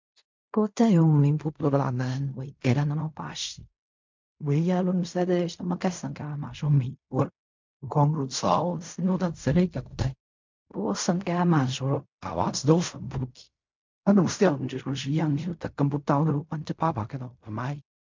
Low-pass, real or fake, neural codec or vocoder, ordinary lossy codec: 7.2 kHz; fake; codec, 16 kHz in and 24 kHz out, 0.4 kbps, LongCat-Audio-Codec, fine tuned four codebook decoder; MP3, 64 kbps